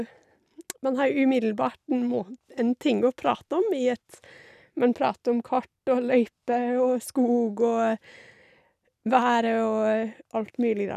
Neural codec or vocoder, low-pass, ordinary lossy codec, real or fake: none; 14.4 kHz; none; real